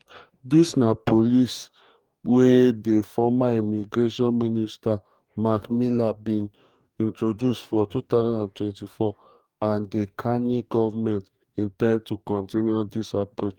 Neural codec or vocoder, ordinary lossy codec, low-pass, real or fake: codec, 44.1 kHz, 2.6 kbps, DAC; Opus, 32 kbps; 19.8 kHz; fake